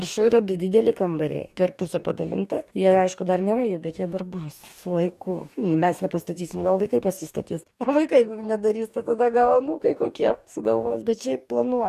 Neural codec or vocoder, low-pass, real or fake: codec, 44.1 kHz, 2.6 kbps, DAC; 14.4 kHz; fake